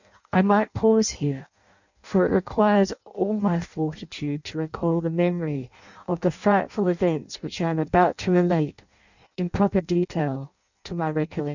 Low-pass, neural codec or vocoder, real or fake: 7.2 kHz; codec, 16 kHz in and 24 kHz out, 0.6 kbps, FireRedTTS-2 codec; fake